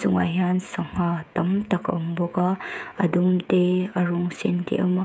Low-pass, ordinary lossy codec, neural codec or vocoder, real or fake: none; none; codec, 16 kHz, 16 kbps, FreqCodec, smaller model; fake